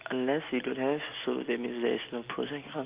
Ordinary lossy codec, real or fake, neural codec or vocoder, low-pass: Opus, 32 kbps; real; none; 3.6 kHz